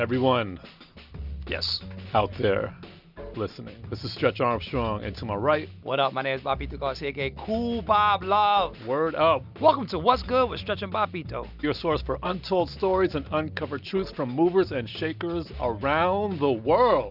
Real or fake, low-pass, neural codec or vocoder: real; 5.4 kHz; none